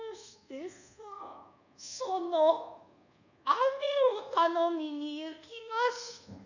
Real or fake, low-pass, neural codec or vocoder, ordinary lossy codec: fake; 7.2 kHz; codec, 24 kHz, 1.2 kbps, DualCodec; none